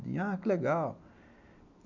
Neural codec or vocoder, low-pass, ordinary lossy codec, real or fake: none; 7.2 kHz; none; real